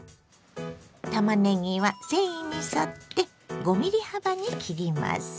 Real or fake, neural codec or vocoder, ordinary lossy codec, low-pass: real; none; none; none